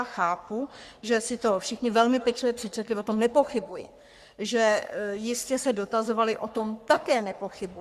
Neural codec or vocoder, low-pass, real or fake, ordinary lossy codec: codec, 44.1 kHz, 3.4 kbps, Pupu-Codec; 14.4 kHz; fake; Opus, 64 kbps